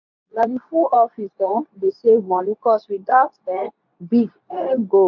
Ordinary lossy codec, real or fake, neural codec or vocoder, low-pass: none; fake; codec, 24 kHz, 0.9 kbps, WavTokenizer, medium speech release version 2; 7.2 kHz